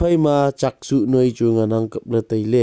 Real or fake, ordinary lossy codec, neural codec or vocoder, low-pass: real; none; none; none